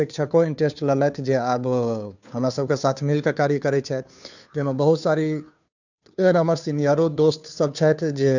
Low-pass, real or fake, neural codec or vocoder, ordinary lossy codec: 7.2 kHz; fake; codec, 16 kHz, 2 kbps, FunCodec, trained on Chinese and English, 25 frames a second; none